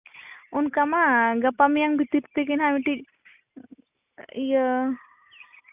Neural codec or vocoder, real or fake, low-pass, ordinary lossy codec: none; real; 3.6 kHz; none